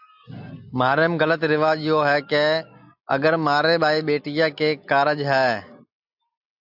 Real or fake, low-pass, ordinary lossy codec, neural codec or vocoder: real; 5.4 kHz; AAC, 48 kbps; none